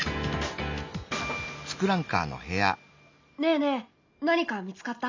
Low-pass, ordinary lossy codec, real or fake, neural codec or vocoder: 7.2 kHz; MP3, 64 kbps; real; none